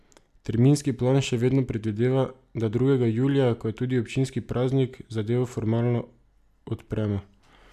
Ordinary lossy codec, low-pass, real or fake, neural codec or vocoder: none; 14.4 kHz; real; none